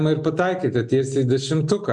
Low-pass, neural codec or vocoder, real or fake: 10.8 kHz; none; real